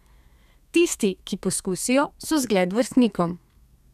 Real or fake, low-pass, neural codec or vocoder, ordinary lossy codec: fake; 14.4 kHz; codec, 32 kHz, 1.9 kbps, SNAC; none